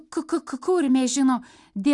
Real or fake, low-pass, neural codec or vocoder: real; 10.8 kHz; none